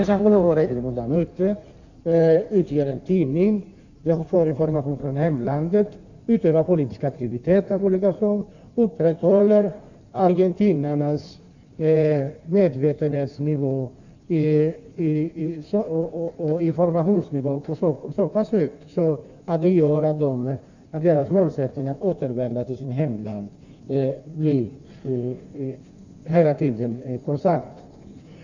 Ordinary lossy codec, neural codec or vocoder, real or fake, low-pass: none; codec, 16 kHz in and 24 kHz out, 1.1 kbps, FireRedTTS-2 codec; fake; 7.2 kHz